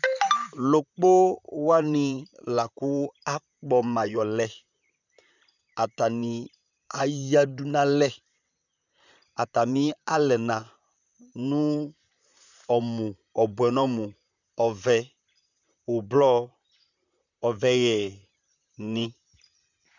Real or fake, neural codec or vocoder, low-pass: fake; vocoder, 44.1 kHz, 128 mel bands, Pupu-Vocoder; 7.2 kHz